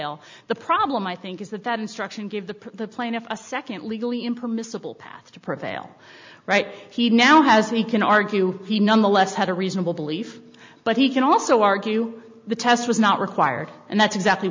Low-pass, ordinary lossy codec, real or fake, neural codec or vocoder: 7.2 kHz; MP3, 64 kbps; real; none